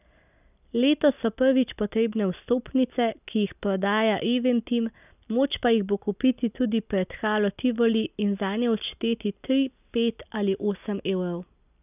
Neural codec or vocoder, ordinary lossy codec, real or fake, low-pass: none; none; real; 3.6 kHz